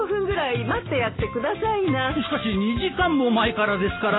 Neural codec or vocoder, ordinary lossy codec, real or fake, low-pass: none; AAC, 16 kbps; real; 7.2 kHz